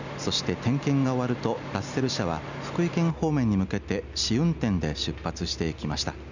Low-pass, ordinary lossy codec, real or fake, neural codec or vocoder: 7.2 kHz; none; real; none